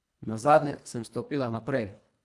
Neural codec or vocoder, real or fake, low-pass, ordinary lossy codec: codec, 24 kHz, 1.5 kbps, HILCodec; fake; none; none